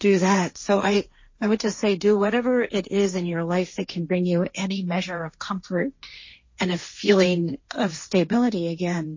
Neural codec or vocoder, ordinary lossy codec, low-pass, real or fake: codec, 16 kHz, 1.1 kbps, Voila-Tokenizer; MP3, 32 kbps; 7.2 kHz; fake